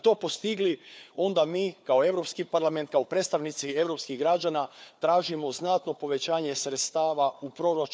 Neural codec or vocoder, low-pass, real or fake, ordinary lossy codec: codec, 16 kHz, 4 kbps, FunCodec, trained on Chinese and English, 50 frames a second; none; fake; none